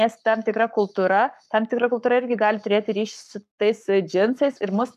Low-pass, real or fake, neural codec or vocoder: 14.4 kHz; fake; codec, 44.1 kHz, 7.8 kbps, Pupu-Codec